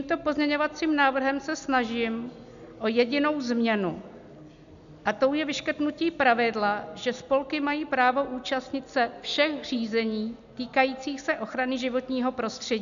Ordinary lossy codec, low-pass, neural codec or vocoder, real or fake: MP3, 96 kbps; 7.2 kHz; none; real